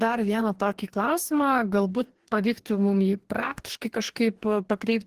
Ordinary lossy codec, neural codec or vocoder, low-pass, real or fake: Opus, 16 kbps; codec, 44.1 kHz, 2.6 kbps, DAC; 14.4 kHz; fake